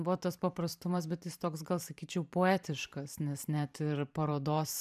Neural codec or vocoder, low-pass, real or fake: vocoder, 44.1 kHz, 128 mel bands every 512 samples, BigVGAN v2; 14.4 kHz; fake